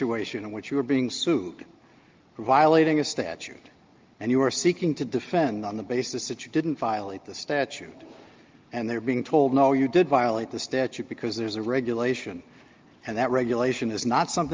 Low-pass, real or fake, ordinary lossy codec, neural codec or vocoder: 7.2 kHz; real; Opus, 24 kbps; none